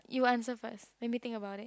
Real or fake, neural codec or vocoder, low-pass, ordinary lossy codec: real; none; none; none